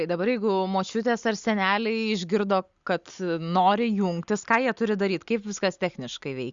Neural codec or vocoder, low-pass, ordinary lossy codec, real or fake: none; 7.2 kHz; Opus, 64 kbps; real